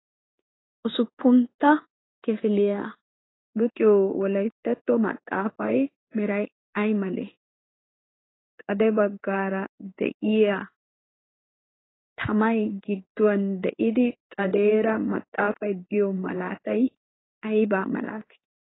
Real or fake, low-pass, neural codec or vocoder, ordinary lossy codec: fake; 7.2 kHz; codec, 16 kHz in and 24 kHz out, 1 kbps, XY-Tokenizer; AAC, 16 kbps